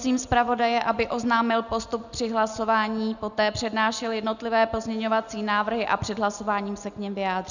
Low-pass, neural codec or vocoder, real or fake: 7.2 kHz; none; real